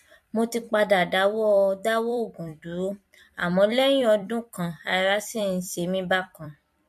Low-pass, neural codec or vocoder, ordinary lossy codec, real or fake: 14.4 kHz; vocoder, 44.1 kHz, 128 mel bands every 256 samples, BigVGAN v2; MP3, 64 kbps; fake